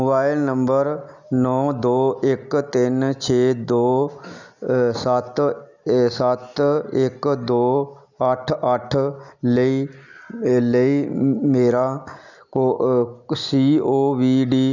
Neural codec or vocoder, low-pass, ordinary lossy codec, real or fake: none; 7.2 kHz; none; real